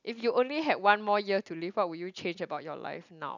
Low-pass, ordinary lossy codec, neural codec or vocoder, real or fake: 7.2 kHz; none; none; real